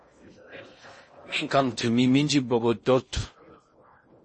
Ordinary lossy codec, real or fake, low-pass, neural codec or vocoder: MP3, 32 kbps; fake; 10.8 kHz; codec, 16 kHz in and 24 kHz out, 0.6 kbps, FocalCodec, streaming, 4096 codes